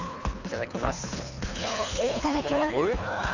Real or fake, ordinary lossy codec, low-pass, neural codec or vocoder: fake; none; 7.2 kHz; codec, 24 kHz, 3 kbps, HILCodec